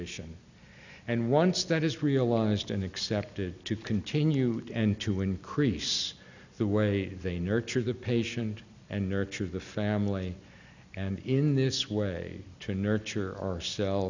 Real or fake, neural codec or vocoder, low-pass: real; none; 7.2 kHz